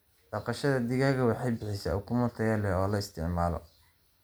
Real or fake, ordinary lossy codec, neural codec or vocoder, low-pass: real; none; none; none